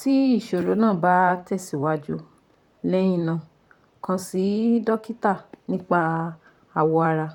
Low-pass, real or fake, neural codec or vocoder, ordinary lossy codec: 19.8 kHz; fake; vocoder, 44.1 kHz, 128 mel bands, Pupu-Vocoder; none